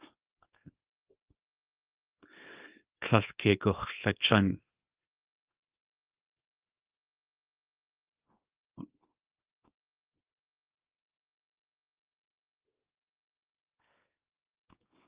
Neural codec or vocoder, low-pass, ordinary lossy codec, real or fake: codec, 24 kHz, 0.9 kbps, WavTokenizer, small release; 3.6 kHz; Opus, 24 kbps; fake